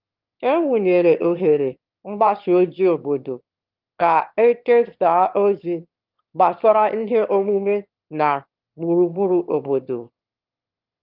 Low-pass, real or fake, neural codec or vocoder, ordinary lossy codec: 5.4 kHz; fake; autoencoder, 22.05 kHz, a latent of 192 numbers a frame, VITS, trained on one speaker; Opus, 32 kbps